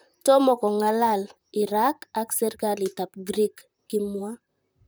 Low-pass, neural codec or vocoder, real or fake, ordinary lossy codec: none; vocoder, 44.1 kHz, 128 mel bands, Pupu-Vocoder; fake; none